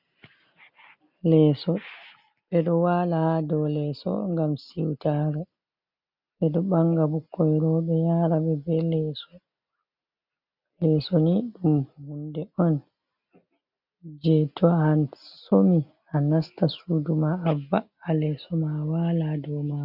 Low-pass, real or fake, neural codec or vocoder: 5.4 kHz; real; none